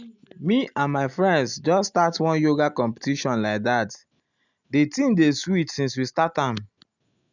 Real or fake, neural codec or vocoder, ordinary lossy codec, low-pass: real; none; none; 7.2 kHz